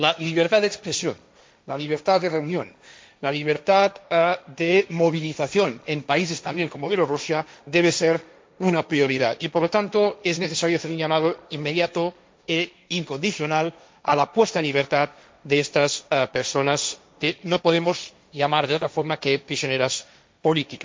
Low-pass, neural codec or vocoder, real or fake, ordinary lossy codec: none; codec, 16 kHz, 1.1 kbps, Voila-Tokenizer; fake; none